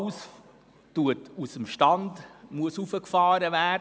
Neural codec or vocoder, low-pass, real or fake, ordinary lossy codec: none; none; real; none